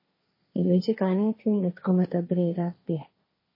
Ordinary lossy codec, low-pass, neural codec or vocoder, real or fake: MP3, 24 kbps; 5.4 kHz; codec, 16 kHz, 1.1 kbps, Voila-Tokenizer; fake